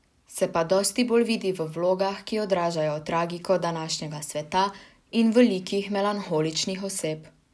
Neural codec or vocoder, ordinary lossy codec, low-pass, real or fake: none; none; none; real